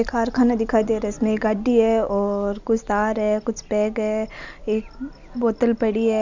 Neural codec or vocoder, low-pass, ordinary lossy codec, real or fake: none; 7.2 kHz; none; real